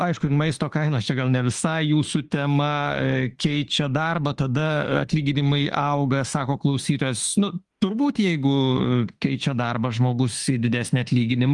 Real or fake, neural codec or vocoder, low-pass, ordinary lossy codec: fake; autoencoder, 48 kHz, 32 numbers a frame, DAC-VAE, trained on Japanese speech; 10.8 kHz; Opus, 24 kbps